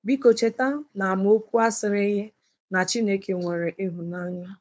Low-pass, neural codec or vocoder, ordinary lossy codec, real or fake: none; codec, 16 kHz, 4.8 kbps, FACodec; none; fake